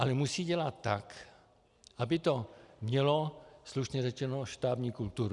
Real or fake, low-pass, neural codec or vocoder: real; 10.8 kHz; none